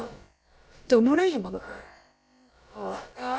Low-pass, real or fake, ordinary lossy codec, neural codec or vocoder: none; fake; none; codec, 16 kHz, about 1 kbps, DyCAST, with the encoder's durations